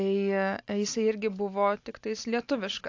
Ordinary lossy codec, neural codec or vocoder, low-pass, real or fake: AAC, 48 kbps; none; 7.2 kHz; real